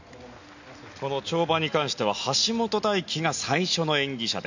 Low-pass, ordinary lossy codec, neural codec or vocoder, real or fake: 7.2 kHz; none; none; real